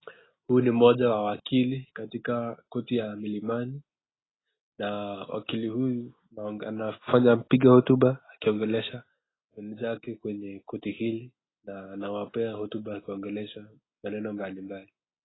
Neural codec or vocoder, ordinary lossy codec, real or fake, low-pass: none; AAC, 16 kbps; real; 7.2 kHz